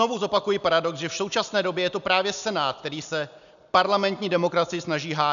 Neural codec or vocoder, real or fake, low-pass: none; real; 7.2 kHz